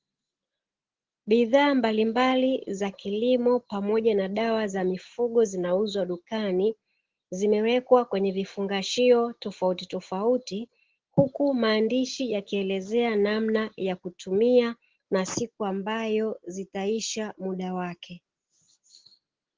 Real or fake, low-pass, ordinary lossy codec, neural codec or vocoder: real; 7.2 kHz; Opus, 16 kbps; none